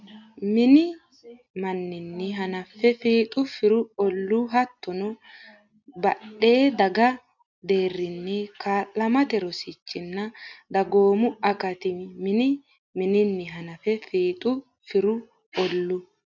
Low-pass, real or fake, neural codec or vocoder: 7.2 kHz; real; none